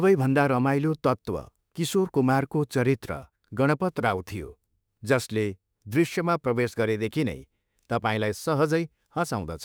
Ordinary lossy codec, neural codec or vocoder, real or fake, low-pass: none; autoencoder, 48 kHz, 32 numbers a frame, DAC-VAE, trained on Japanese speech; fake; none